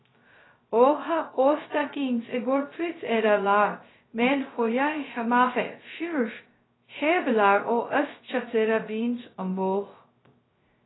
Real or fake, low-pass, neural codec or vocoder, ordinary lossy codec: fake; 7.2 kHz; codec, 16 kHz, 0.2 kbps, FocalCodec; AAC, 16 kbps